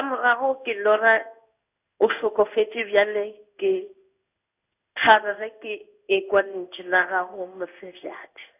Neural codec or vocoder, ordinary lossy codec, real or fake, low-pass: codec, 16 kHz in and 24 kHz out, 1 kbps, XY-Tokenizer; none; fake; 3.6 kHz